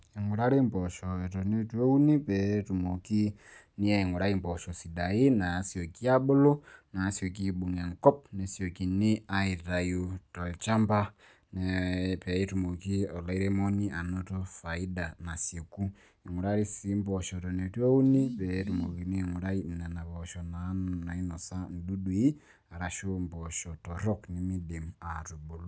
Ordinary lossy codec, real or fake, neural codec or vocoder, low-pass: none; real; none; none